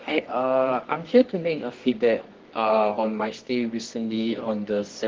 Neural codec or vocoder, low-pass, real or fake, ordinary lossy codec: codec, 24 kHz, 0.9 kbps, WavTokenizer, medium music audio release; 7.2 kHz; fake; Opus, 16 kbps